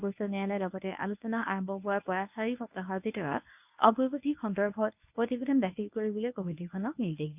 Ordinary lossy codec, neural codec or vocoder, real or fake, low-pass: none; codec, 24 kHz, 0.9 kbps, WavTokenizer, medium speech release version 1; fake; 3.6 kHz